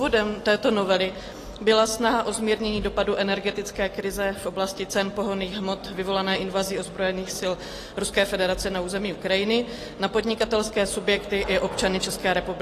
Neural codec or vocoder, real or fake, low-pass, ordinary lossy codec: none; real; 14.4 kHz; AAC, 48 kbps